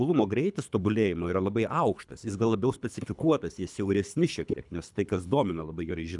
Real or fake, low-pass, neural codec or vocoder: fake; 10.8 kHz; codec, 24 kHz, 3 kbps, HILCodec